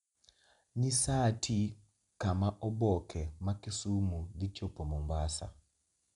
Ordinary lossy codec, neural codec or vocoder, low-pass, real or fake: none; none; 10.8 kHz; real